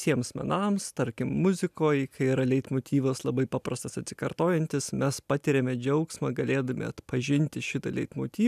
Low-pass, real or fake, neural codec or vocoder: 14.4 kHz; real; none